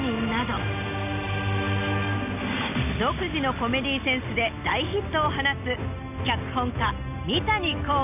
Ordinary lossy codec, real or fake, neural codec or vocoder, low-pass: none; real; none; 3.6 kHz